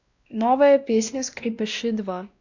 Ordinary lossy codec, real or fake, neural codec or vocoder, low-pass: none; fake; codec, 16 kHz, 1 kbps, X-Codec, WavLM features, trained on Multilingual LibriSpeech; 7.2 kHz